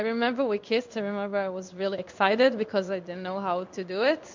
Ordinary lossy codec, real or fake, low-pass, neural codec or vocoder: MP3, 64 kbps; fake; 7.2 kHz; codec, 16 kHz in and 24 kHz out, 1 kbps, XY-Tokenizer